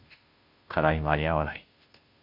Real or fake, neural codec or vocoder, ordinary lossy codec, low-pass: fake; codec, 16 kHz, 0.5 kbps, FunCodec, trained on Chinese and English, 25 frames a second; MP3, 48 kbps; 5.4 kHz